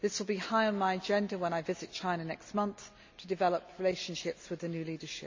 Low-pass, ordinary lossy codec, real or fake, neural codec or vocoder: 7.2 kHz; MP3, 64 kbps; real; none